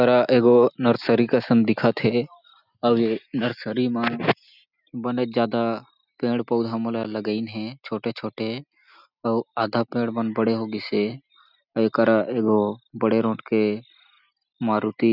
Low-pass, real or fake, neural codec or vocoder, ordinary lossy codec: 5.4 kHz; real; none; none